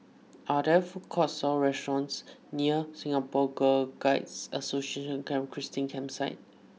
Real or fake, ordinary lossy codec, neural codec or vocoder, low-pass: real; none; none; none